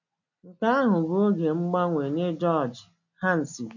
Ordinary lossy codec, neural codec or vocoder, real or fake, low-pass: none; none; real; 7.2 kHz